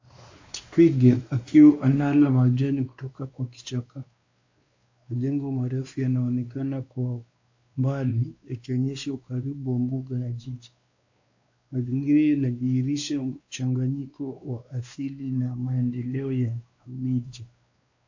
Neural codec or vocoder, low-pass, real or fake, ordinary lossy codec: codec, 16 kHz, 2 kbps, X-Codec, WavLM features, trained on Multilingual LibriSpeech; 7.2 kHz; fake; AAC, 48 kbps